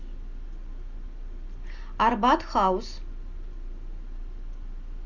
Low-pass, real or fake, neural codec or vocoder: 7.2 kHz; real; none